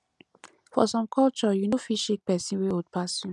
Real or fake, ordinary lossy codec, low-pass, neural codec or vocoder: real; none; 10.8 kHz; none